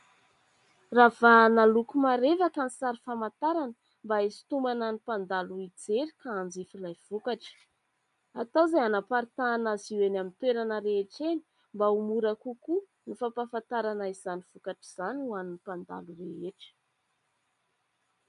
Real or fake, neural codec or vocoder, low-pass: real; none; 10.8 kHz